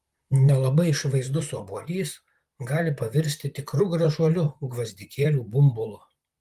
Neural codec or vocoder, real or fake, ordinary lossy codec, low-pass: vocoder, 44.1 kHz, 128 mel bands, Pupu-Vocoder; fake; Opus, 32 kbps; 14.4 kHz